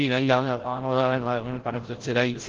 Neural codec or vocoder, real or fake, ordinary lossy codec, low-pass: codec, 16 kHz, 0.5 kbps, FreqCodec, larger model; fake; Opus, 16 kbps; 7.2 kHz